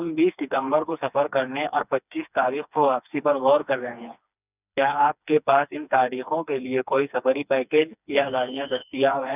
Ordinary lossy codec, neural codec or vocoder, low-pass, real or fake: none; codec, 16 kHz, 2 kbps, FreqCodec, smaller model; 3.6 kHz; fake